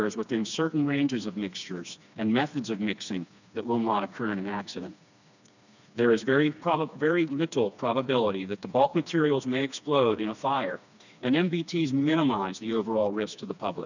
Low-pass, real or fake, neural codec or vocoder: 7.2 kHz; fake; codec, 16 kHz, 2 kbps, FreqCodec, smaller model